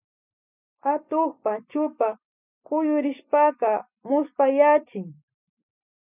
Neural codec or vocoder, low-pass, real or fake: none; 3.6 kHz; real